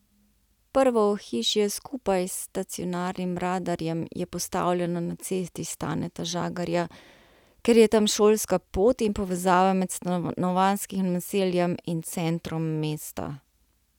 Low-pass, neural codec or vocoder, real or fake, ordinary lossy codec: 19.8 kHz; none; real; none